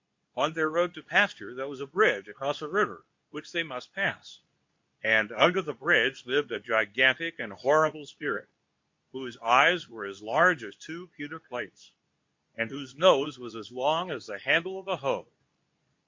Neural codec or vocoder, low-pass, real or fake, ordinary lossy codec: codec, 24 kHz, 0.9 kbps, WavTokenizer, medium speech release version 2; 7.2 kHz; fake; MP3, 48 kbps